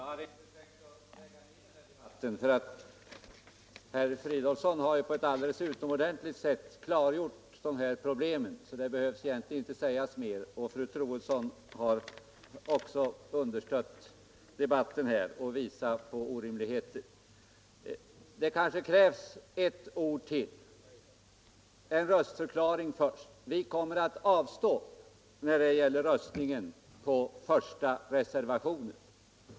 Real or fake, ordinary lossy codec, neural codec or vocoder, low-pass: real; none; none; none